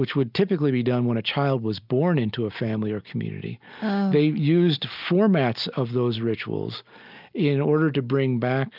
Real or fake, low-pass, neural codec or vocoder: real; 5.4 kHz; none